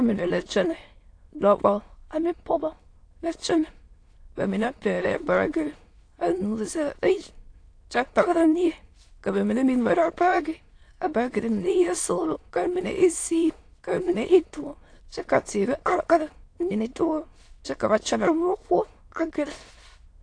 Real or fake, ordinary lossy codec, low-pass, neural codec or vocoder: fake; AAC, 48 kbps; 9.9 kHz; autoencoder, 22.05 kHz, a latent of 192 numbers a frame, VITS, trained on many speakers